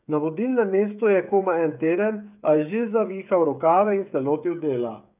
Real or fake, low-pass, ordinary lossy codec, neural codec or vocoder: fake; 3.6 kHz; none; codec, 16 kHz, 8 kbps, FreqCodec, smaller model